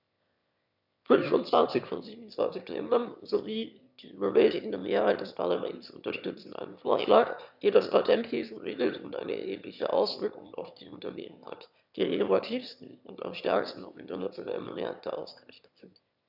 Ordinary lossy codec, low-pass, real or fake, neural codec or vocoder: none; 5.4 kHz; fake; autoencoder, 22.05 kHz, a latent of 192 numbers a frame, VITS, trained on one speaker